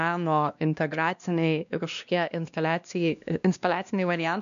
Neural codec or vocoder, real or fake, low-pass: codec, 16 kHz, 1 kbps, X-Codec, WavLM features, trained on Multilingual LibriSpeech; fake; 7.2 kHz